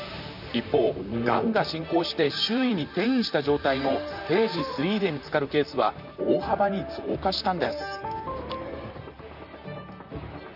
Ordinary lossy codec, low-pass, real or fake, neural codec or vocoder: none; 5.4 kHz; fake; vocoder, 44.1 kHz, 128 mel bands, Pupu-Vocoder